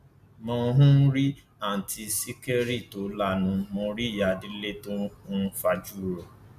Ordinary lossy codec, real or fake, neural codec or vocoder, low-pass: none; real; none; 14.4 kHz